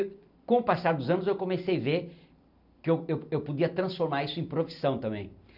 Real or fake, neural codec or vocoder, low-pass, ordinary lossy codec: real; none; 5.4 kHz; none